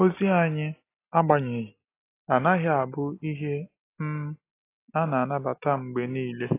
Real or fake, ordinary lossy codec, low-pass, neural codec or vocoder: real; AAC, 24 kbps; 3.6 kHz; none